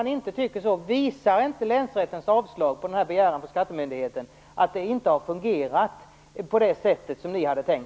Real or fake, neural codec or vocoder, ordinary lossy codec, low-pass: real; none; none; none